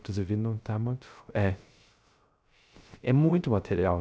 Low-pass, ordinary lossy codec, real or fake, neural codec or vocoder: none; none; fake; codec, 16 kHz, 0.3 kbps, FocalCodec